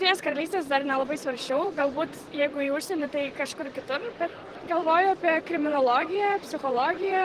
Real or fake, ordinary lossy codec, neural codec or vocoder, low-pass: fake; Opus, 16 kbps; vocoder, 44.1 kHz, 128 mel bands, Pupu-Vocoder; 14.4 kHz